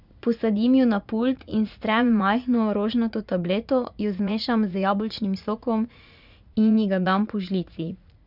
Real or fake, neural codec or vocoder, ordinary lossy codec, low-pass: fake; vocoder, 44.1 kHz, 80 mel bands, Vocos; none; 5.4 kHz